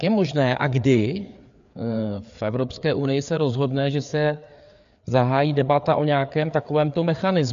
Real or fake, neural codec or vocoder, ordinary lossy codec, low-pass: fake; codec, 16 kHz, 4 kbps, FreqCodec, larger model; MP3, 64 kbps; 7.2 kHz